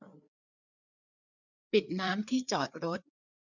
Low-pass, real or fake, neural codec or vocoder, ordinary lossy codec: 7.2 kHz; fake; codec, 16 kHz, 4 kbps, FreqCodec, larger model; none